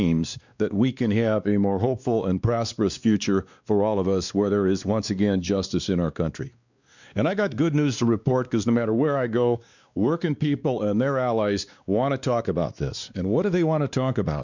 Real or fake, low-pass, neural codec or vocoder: fake; 7.2 kHz; codec, 16 kHz, 2 kbps, X-Codec, WavLM features, trained on Multilingual LibriSpeech